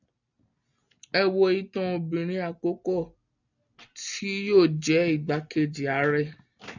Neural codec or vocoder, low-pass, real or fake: none; 7.2 kHz; real